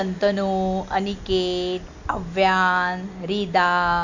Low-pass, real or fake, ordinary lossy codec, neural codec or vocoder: 7.2 kHz; real; none; none